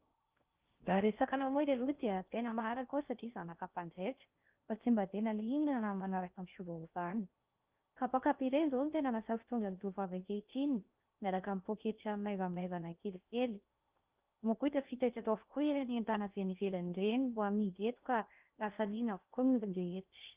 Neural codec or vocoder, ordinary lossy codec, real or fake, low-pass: codec, 16 kHz in and 24 kHz out, 0.6 kbps, FocalCodec, streaming, 2048 codes; Opus, 32 kbps; fake; 3.6 kHz